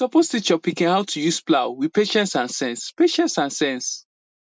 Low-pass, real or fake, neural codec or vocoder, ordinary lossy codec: none; real; none; none